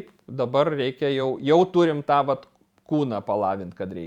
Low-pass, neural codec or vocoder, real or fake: 19.8 kHz; vocoder, 44.1 kHz, 128 mel bands every 512 samples, BigVGAN v2; fake